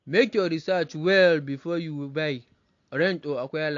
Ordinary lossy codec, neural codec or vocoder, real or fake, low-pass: AAC, 48 kbps; none; real; 7.2 kHz